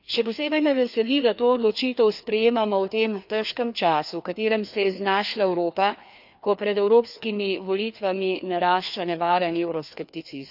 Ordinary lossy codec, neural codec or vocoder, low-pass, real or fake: none; codec, 16 kHz, 2 kbps, FreqCodec, larger model; 5.4 kHz; fake